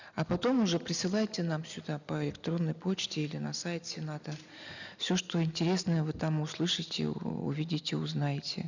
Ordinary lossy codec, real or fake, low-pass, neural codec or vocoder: none; real; 7.2 kHz; none